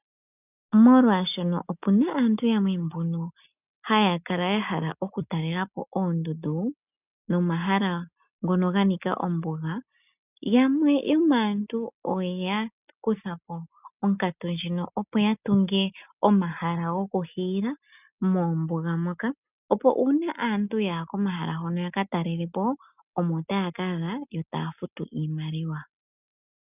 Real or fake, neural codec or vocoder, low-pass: real; none; 3.6 kHz